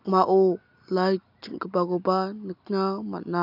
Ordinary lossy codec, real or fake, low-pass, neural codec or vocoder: none; real; 5.4 kHz; none